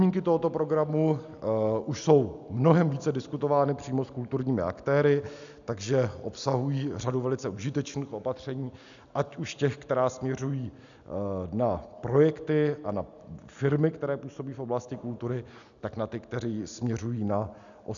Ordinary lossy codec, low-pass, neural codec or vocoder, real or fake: MP3, 96 kbps; 7.2 kHz; none; real